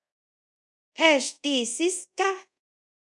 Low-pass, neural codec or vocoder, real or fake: 10.8 kHz; codec, 24 kHz, 0.5 kbps, DualCodec; fake